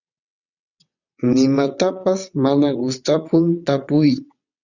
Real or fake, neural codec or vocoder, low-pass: fake; vocoder, 22.05 kHz, 80 mel bands, WaveNeXt; 7.2 kHz